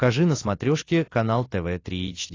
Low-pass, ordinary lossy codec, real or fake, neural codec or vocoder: 7.2 kHz; AAC, 32 kbps; real; none